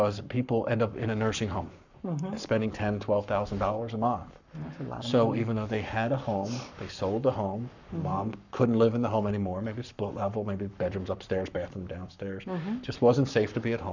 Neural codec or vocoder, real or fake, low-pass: codec, 44.1 kHz, 7.8 kbps, Pupu-Codec; fake; 7.2 kHz